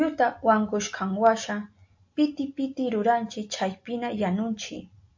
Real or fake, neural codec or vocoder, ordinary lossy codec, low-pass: real; none; MP3, 48 kbps; 7.2 kHz